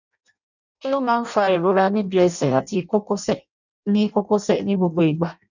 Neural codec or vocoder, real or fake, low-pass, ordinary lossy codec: codec, 16 kHz in and 24 kHz out, 0.6 kbps, FireRedTTS-2 codec; fake; 7.2 kHz; none